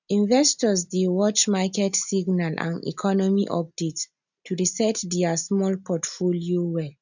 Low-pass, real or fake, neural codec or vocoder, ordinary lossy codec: 7.2 kHz; real; none; none